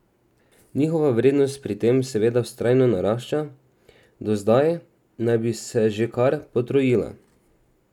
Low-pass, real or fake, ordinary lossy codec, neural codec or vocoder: 19.8 kHz; real; none; none